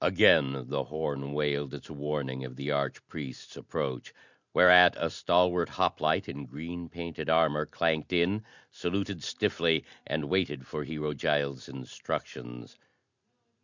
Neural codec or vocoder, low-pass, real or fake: none; 7.2 kHz; real